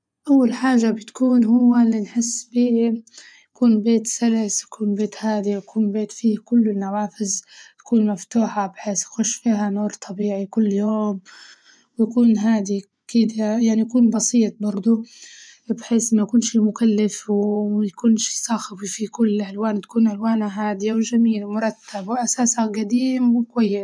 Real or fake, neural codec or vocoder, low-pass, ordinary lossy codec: fake; vocoder, 24 kHz, 100 mel bands, Vocos; 9.9 kHz; none